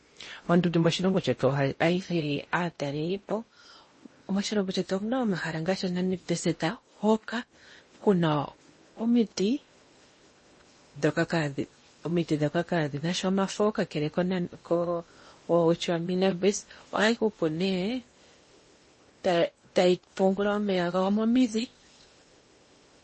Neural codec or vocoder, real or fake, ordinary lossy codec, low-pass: codec, 16 kHz in and 24 kHz out, 0.8 kbps, FocalCodec, streaming, 65536 codes; fake; MP3, 32 kbps; 10.8 kHz